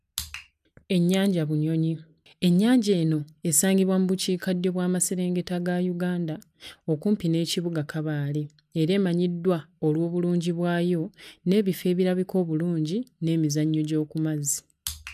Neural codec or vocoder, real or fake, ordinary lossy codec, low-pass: none; real; none; 14.4 kHz